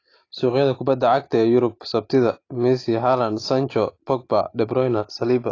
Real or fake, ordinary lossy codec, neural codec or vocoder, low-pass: real; AAC, 32 kbps; none; 7.2 kHz